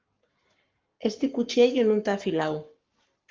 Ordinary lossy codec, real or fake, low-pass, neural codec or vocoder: Opus, 32 kbps; fake; 7.2 kHz; codec, 44.1 kHz, 7.8 kbps, Pupu-Codec